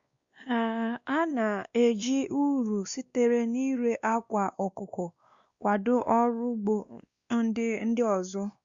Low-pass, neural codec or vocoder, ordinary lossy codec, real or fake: 7.2 kHz; codec, 16 kHz, 2 kbps, X-Codec, WavLM features, trained on Multilingual LibriSpeech; Opus, 64 kbps; fake